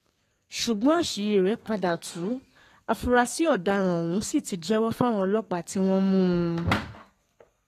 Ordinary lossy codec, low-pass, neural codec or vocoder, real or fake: AAC, 48 kbps; 14.4 kHz; codec, 32 kHz, 1.9 kbps, SNAC; fake